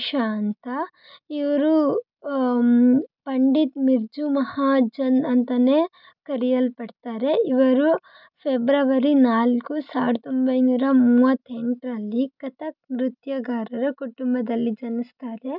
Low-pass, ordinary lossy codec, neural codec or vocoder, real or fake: 5.4 kHz; none; none; real